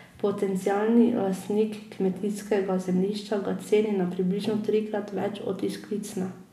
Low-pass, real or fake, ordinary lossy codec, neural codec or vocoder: 14.4 kHz; real; none; none